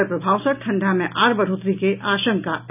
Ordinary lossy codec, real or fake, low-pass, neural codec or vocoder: none; real; 3.6 kHz; none